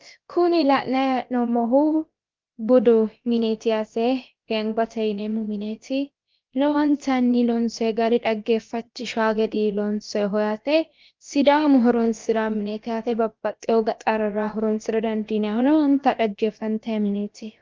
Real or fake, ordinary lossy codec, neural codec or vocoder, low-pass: fake; Opus, 32 kbps; codec, 16 kHz, about 1 kbps, DyCAST, with the encoder's durations; 7.2 kHz